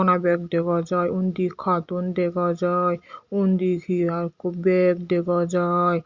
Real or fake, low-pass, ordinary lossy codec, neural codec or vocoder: real; 7.2 kHz; Opus, 64 kbps; none